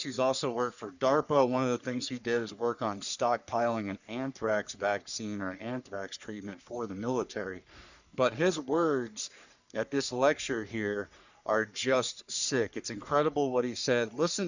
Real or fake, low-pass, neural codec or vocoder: fake; 7.2 kHz; codec, 44.1 kHz, 3.4 kbps, Pupu-Codec